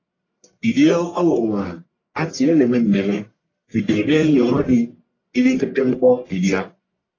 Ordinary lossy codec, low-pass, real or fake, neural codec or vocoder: AAC, 32 kbps; 7.2 kHz; fake; codec, 44.1 kHz, 1.7 kbps, Pupu-Codec